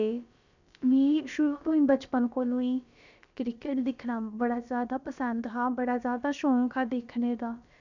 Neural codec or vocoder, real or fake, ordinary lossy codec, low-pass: codec, 16 kHz, about 1 kbps, DyCAST, with the encoder's durations; fake; none; 7.2 kHz